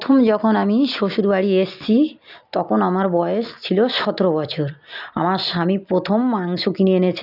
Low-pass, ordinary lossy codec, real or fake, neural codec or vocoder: 5.4 kHz; none; real; none